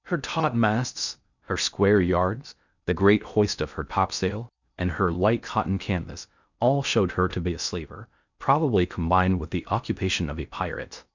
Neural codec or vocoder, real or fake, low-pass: codec, 16 kHz in and 24 kHz out, 0.6 kbps, FocalCodec, streaming, 2048 codes; fake; 7.2 kHz